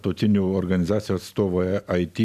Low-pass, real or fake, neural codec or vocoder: 14.4 kHz; real; none